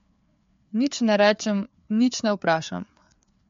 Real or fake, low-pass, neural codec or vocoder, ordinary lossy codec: fake; 7.2 kHz; codec, 16 kHz, 4 kbps, FreqCodec, larger model; MP3, 48 kbps